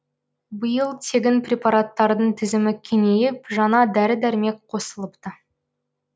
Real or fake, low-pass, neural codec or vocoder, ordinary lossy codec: real; none; none; none